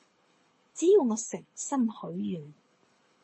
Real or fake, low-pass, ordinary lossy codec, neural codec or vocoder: fake; 9.9 kHz; MP3, 32 kbps; codec, 24 kHz, 6 kbps, HILCodec